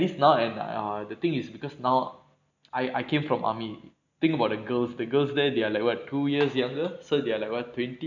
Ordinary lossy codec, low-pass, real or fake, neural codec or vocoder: none; 7.2 kHz; real; none